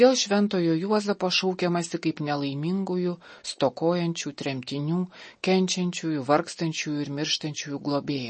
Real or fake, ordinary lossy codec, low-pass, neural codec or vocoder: real; MP3, 32 kbps; 10.8 kHz; none